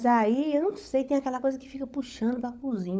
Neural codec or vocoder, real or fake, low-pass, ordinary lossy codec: codec, 16 kHz, 16 kbps, FunCodec, trained on LibriTTS, 50 frames a second; fake; none; none